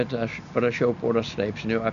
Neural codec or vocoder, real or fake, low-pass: none; real; 7.2 kHz